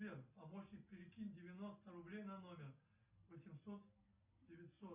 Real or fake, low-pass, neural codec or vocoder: real; 3.6 kHz; none